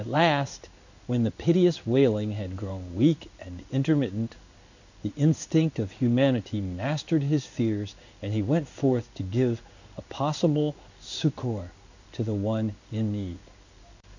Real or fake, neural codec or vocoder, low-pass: fake; codec, 16 kHz in and 24 kHz out, 1 kbps, XY-Tokenizer; 7.2 kHz